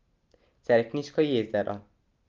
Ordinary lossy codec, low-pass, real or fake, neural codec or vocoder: Opus, 32 kbps; 7.2 kHz; real; none